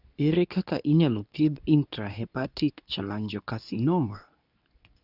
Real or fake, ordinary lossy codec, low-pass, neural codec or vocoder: fake; none; 5.4 kHz; codec, 24 kHz, 0.9 kbps, WavTokenizer, medium speech release version 2